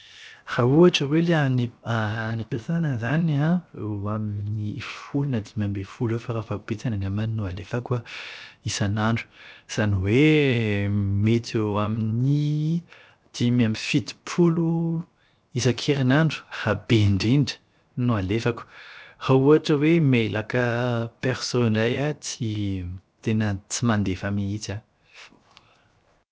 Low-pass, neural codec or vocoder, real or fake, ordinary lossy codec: none; codec, 16 kHz, 0.7 kbps, FocalCodec; fake; none